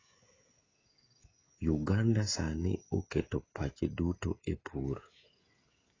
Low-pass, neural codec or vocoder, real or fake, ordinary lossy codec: 7.2 kHz; codec, 16 kHz, 16 kbps, FreqCodec, smaller model; fake; AAC, 32 kbps